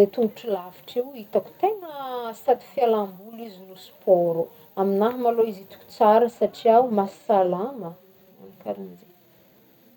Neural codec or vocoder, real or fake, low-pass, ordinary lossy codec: none; real; 19.8 kHz; none